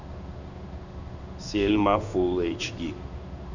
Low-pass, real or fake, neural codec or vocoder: 7.2 kHz; fake; codec, 16 kHz in and 24 kHz out, 1 kbps, XY-Tokenizer